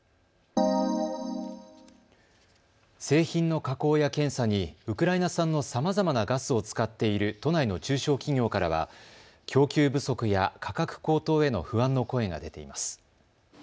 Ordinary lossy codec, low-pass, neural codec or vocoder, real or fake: none; none; none; real